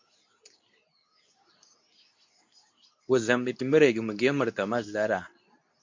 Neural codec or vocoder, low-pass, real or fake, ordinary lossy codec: codec, 24 kHz, 0.9 kbps, WavTokenizer, medium speech release version 2; 7.2 kHz; fake; MP3, 48 kbps